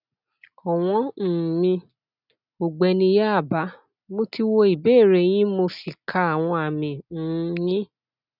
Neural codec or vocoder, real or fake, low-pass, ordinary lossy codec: none; real; 5.4 kHz; none